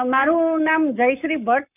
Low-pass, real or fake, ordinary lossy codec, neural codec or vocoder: 3.6 kHz; fake; none; codec, 44.1 kHz, 7.8 kbps, Pupu-Codec